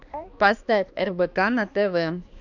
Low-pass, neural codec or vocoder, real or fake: 7.2 kHz; codec, 16 kHz, 2 kbps, X-Codec, HuBERT features, trained on balanced general audio; fake